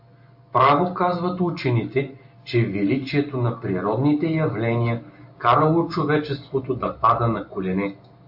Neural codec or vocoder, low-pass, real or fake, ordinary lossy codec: none; 5.4 kHz; real; AAC, 48 kbps